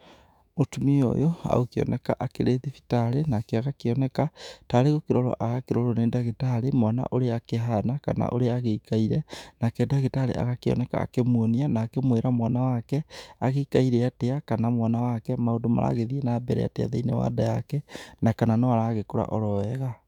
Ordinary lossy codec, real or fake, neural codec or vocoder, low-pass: none; fake; autoencoder, 48 kHz, 128 numbers a frame, DAC-VAE, trained on Japanese speech; 19.8 kHz